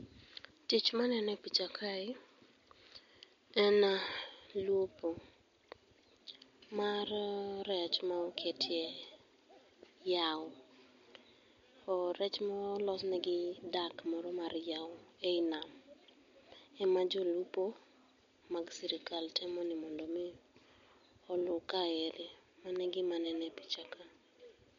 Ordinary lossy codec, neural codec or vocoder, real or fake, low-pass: MP3, 48 kbps; none; real; 7.2 kHz